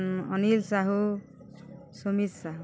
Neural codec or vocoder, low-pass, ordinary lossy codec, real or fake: none; none; none; real